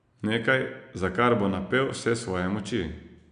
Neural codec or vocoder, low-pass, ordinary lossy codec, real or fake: none; 9.9 kHz; none; real